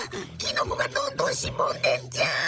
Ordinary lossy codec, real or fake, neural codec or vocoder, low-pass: none; fake; codec, 16 kHz, 16 kbps, FunCodec, trained on LibriTTS, 50 frames a second; none